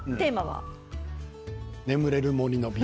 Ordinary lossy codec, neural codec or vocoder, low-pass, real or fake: none; none; none; real